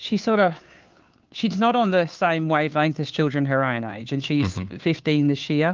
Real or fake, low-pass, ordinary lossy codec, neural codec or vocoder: fake; 7.2 kHz; Opus, 32 kbps; codec, 16 kHz, 2 kbps, FunCodec, trained on Chinese and English, 25 frames a second